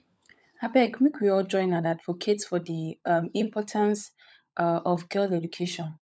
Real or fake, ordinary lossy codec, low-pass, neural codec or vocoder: fake; none; none; codec, 16 kHz, 16 kbps, FunCodec, trained on LibriTTS, 50 frames a second